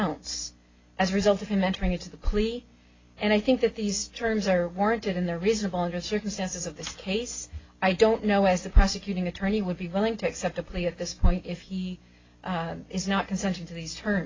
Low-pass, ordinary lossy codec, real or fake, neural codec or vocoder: 7.2 kHz; AAC, 32 kbps; real; none